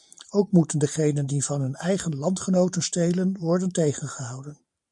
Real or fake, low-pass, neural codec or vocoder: fake; 10.8 kHz; vocoder, 44.1 kHz, 128 mel bands every 512 samples, BigVGAN v2